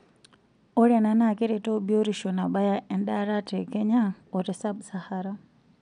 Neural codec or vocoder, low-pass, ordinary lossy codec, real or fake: none; 9.9 kHz; none; real